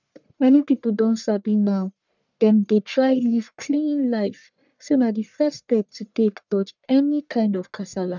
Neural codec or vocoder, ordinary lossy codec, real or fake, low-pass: codec, 44.1 kHz, 1.7 kbps, Pupu-Codec; none; fake; 7.2 kHz